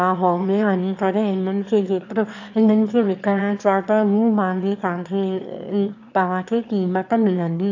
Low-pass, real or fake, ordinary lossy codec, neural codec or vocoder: 7.2 kHz; fake; AAC, 48 kbps; autoencoder, 22.05 kHz, a latent of 192 numbers a frame, VITS, trained on one speaker